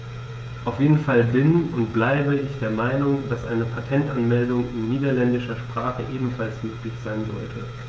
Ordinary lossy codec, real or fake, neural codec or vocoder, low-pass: none; fake; codec, 16 kHz, 16 kbps, FreqCodec, smaller model; none